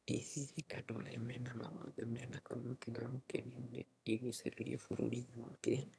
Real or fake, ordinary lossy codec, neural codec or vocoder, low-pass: fake; none; autoencoder, 22.05 kHz, a latent of 192 numbers a frame, VITS, trained on one speaker; none